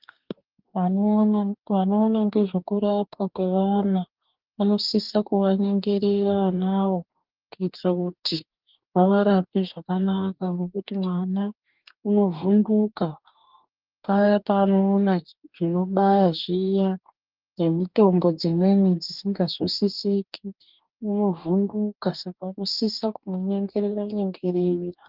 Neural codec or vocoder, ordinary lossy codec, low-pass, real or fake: codec, 44.1 kHz, 2.6 kbps, DAC; Opus, 32 kbps; 5.4 kHz; fake